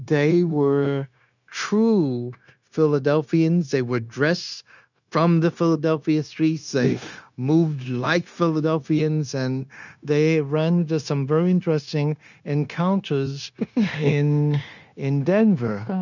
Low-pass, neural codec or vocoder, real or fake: 7.2 kHz; codec, 16 kHz, 0.9 kbps, LongCat-Audio-Codec; fake